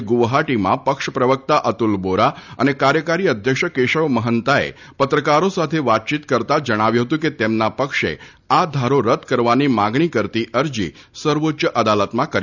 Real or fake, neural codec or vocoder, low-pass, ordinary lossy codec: real; none; 7.2 kHz; none